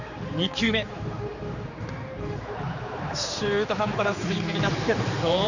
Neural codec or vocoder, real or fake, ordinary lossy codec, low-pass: codec, 16 kHz, 4 kbps, X-Codec, HuBERT features, trained on general audio; fake; none; 7.2 kHz